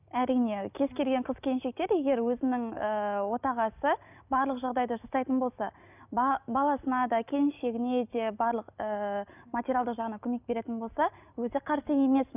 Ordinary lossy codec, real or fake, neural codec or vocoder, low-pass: none; real; none; 3.6 kHz